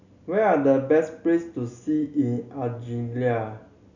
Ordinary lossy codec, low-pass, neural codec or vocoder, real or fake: none; 7.2 kHz; none; real